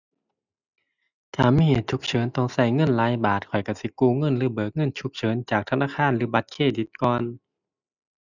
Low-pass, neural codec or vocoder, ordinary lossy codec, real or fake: 7.2 kHz; none; none; real